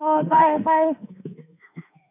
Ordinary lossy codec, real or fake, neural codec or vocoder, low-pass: none; fake; autoencoder, 48 kHz, 32 numbers a frame, DAC-VAE, trained on Japanese speech; 3.6 kHz